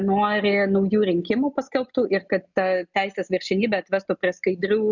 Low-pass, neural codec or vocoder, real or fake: 7.2 kHz; none; real